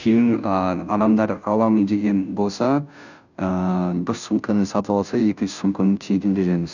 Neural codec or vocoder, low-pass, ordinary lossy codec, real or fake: codec, 16 kHz, 0.5 kbps, FunCodec, trained on Chinese and English, 25 frames a second; 7.2 kHz; none; fake